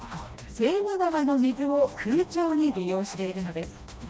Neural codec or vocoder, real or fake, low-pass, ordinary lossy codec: codec, 16 kHz, 1 kbps, FreqCodec, smaller model; fake; none; none